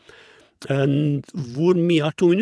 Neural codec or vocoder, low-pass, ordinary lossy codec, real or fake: vocoder, 22.05 kHz, 80 mel bands, WaveNeXt; none; none; fake